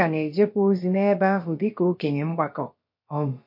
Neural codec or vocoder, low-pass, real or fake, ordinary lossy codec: codec, 16 kHz, about 1 kbps, DyCAST, with the encoder's durations; 5.4 kHz; fake; MP3, 32 kbps